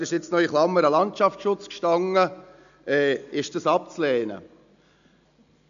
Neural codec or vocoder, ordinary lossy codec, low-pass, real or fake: none; none; 7.2 kHz; real